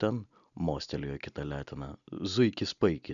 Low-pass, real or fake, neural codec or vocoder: 7.2 kHz; real; none